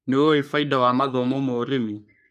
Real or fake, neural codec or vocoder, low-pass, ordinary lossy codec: fake; codec, 44.1 kHz, 3.4 kbps, Pupu-Codec; 14.4 kHz; none